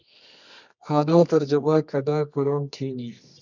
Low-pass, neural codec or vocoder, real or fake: 7.2 kHz; codec, 24 kHz, 0.9 kbps, WavTokenizer, medium music audio release; fake